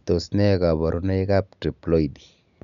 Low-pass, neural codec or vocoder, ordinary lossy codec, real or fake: 7.2 kHz; none; none; real